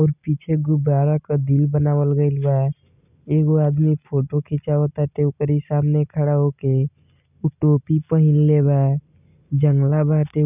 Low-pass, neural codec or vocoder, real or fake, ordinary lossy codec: 3.6 kHz; none; real; none